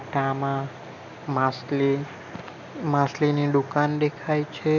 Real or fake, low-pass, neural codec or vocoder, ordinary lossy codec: real; 7.2 kHz; none; none